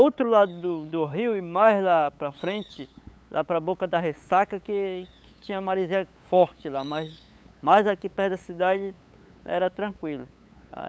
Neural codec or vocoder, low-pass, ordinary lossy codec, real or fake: codec, 16 kHz, 8 kbps, FunCodec, trained on LibriTTS, 25 frames a second; none; none; fake